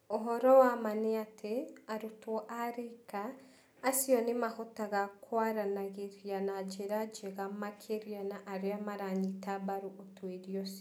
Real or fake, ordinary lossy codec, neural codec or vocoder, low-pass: real; none; none; none